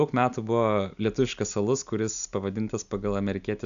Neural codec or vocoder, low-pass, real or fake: none; 7.2 kHz; real